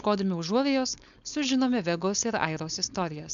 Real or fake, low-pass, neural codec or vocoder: fake; 7.2 kHz; codec, 16 kHz, 4.8 kbps, FACodec